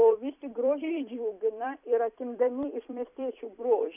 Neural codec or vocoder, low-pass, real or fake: vocoder, 44.1 kHz, 128 mel bands every 256 samples, BigVGAN v2; 3.6 kHz; fake